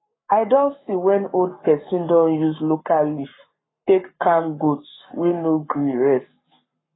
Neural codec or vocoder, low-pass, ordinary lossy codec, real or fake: codec, 44.1 kHz, 7.8 kbps, Pupu-Codec; 7.2 kHz; AAC, 16 kbps; fake